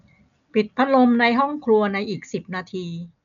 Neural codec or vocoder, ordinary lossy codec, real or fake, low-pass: none; none; real; 7.2 kHz